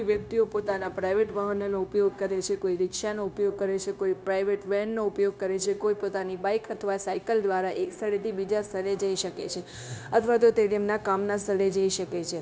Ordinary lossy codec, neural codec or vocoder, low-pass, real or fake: none; codec, 16 kHz, 0.9 kbps, LongCat-Audio-Codec; none; fake